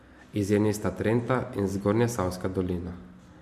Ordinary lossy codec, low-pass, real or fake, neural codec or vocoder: MP3, 64 kbps; 14.4 kHz; real; none